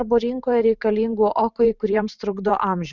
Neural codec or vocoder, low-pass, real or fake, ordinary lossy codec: vocoder, 44.1 kHz, 128 mel bands every 512 samples, BigVGAN v2; 7.2 kHz; fake; Opus, 64 kbps